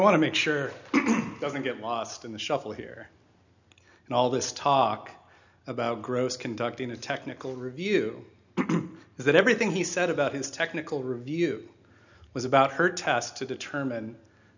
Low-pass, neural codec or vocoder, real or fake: 7.2 kHz; none; real